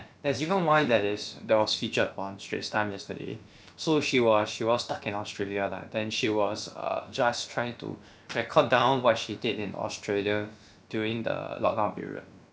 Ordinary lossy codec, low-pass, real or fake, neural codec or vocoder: none; none; fake; codec, 16 kHz, about 1 kbps, DyCAST, with the encoder's durations